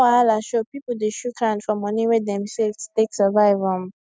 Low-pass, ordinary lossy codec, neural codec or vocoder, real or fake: none; none; none; real